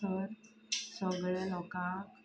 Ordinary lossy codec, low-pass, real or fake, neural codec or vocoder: none; none; real; none